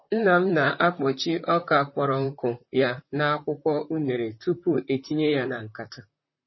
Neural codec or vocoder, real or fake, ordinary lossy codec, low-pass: vocoder, 44.1 kHz, 128 mel bands, Pupu-Vocoder; fake; MP3, 24 kbps; 7.2 kHz